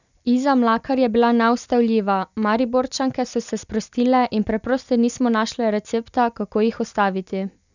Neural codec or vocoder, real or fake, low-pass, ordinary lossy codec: none; real; 7.2 kHz; none